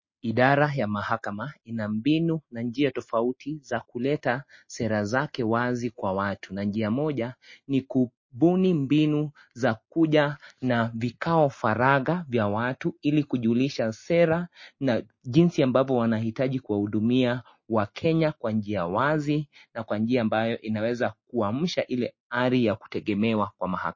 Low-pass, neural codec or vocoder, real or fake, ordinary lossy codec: 7.2 kHz; none; real; MP3, 32 kbps